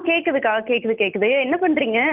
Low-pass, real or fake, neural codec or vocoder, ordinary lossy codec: 3.6 kHz; real; none; Opus, 32 kbps